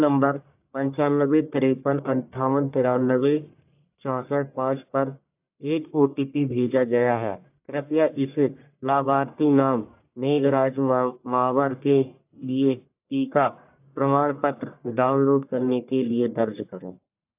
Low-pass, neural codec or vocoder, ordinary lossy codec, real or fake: 3.6 kHz; codec, 44.1 kHz, 1.7 kbps, Pupu-Codec; AAC, 32 kbps; fake